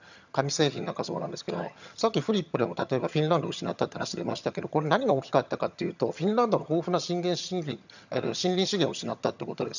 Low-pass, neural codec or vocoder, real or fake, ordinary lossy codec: 7.2 kHz; vocoder, 22.05 kHz, 80 mel bands, HiFi-GAN; fake; none